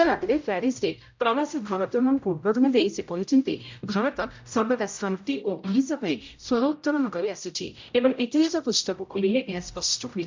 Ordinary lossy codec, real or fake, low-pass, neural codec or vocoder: MP3, 64 kbps; fake; 7.2 kHz; codec, 16 kHz, 0.5 kbps, X-Codec, HuBERT features, trained on general audio